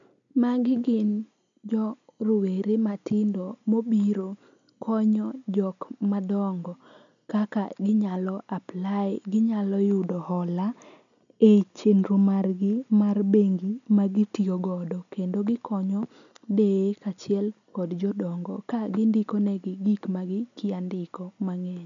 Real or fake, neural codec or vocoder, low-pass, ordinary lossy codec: real; none; 7.2 kHz; none